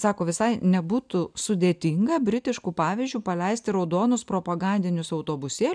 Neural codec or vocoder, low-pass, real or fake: none; 9.9 kHz; real